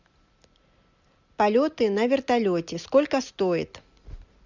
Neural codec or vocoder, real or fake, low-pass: none; real; 7.2 kHz